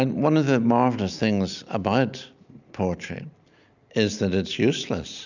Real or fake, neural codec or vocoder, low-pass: real; none; 7.2 kHz